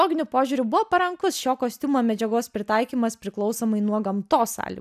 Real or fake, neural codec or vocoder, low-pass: real; none; 14.4 kHz